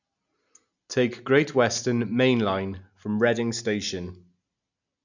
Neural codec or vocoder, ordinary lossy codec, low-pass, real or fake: none; none; 7.2 kHz; real